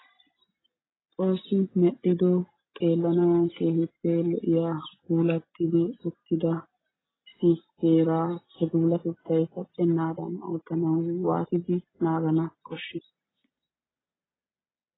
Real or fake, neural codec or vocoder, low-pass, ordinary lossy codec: real; none; 7.2 kHz; AAC, 16 kbps